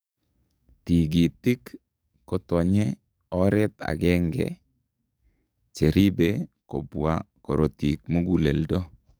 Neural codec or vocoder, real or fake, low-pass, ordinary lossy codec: codec, 44.1 kHz, 7.8 kbps, DAC; fake; none; none